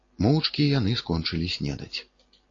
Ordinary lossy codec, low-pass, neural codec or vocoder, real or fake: AAC, 32 kbps; 7.2 kHz; none; real